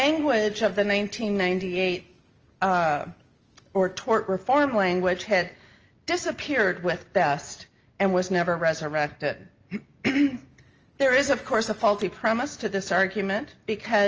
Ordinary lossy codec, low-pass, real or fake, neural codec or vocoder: Opus, 24 kbps; 7.2 kHz; real; none